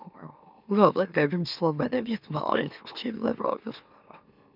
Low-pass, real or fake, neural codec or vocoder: 5.4 kHz; fake; autoencoder, 44.1 kHz, a latent of 192 numbers a frame, MeloTTS